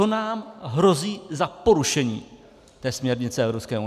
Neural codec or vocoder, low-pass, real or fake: none; 14.4 kHz; real